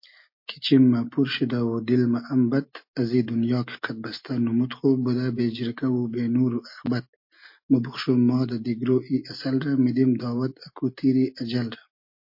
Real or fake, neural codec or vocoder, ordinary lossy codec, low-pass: real; none; MP3, 32 kbps; 5.4 kHz